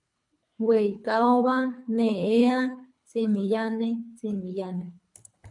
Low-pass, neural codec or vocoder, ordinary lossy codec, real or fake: 10.8 kHz; codec, 24 kHz, 3 kbps, HILCodec; MP3, 64 kbps; fake